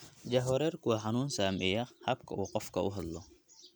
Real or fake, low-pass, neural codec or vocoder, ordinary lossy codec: real; none; none; none